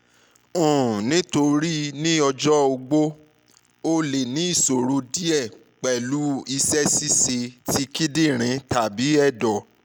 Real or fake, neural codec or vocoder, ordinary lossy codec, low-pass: real; none; none; none